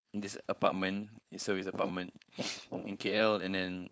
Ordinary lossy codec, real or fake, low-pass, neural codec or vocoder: none; fake; none; codec, 16 kHz, 4.8 kbps, FACodec